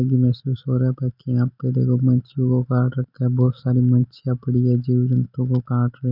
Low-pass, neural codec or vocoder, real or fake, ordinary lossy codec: 5.4 kHz; none; real; none